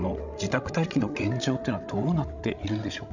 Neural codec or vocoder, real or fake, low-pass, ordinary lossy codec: codec, 16 kHz, 16 kbps, FreqCodec, larger model; fake; 7.2 kHz; none